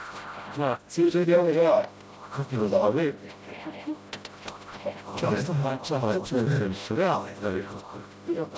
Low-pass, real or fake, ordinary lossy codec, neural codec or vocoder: none; fake; none; codec, 16 kHz, 0.5 kbps, FreqCodec, smaller model